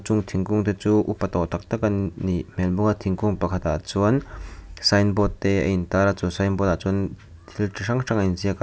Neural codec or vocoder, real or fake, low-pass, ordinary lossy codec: none; real; none; none